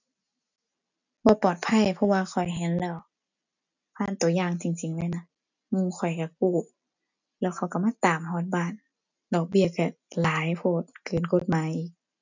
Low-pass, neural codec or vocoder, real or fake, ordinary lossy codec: 7.2 kHz; none; real; none